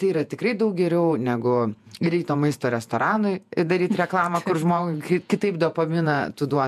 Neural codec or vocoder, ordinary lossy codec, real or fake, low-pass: vocoder, 48 kHz, 128 mel bands, Vocos; MP3, 96 kbps; fake; 14.4 kHz